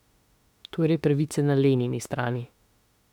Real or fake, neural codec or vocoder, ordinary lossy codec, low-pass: fake; autoencoder, 48 kHz, 32 numbers a frame, DAC-VAE, trained on Japanese speech; none; 19.8 kHz